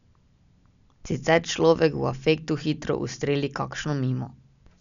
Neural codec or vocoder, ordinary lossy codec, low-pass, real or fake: none; MP3, 96 kbps; 7.2 kHz; real